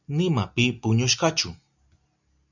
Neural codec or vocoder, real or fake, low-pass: none; real; 7.2 kHz